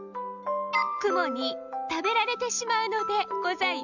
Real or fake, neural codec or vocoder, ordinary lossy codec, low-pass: real; none; none; 7.2 kHz